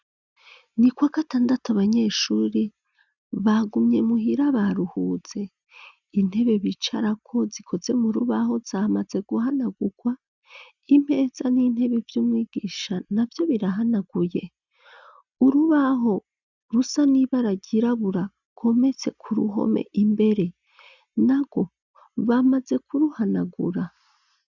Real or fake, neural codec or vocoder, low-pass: real; none; 7.2 kHz